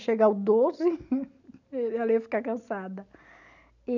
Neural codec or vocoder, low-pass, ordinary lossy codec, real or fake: none; 7.2 kHz; none; real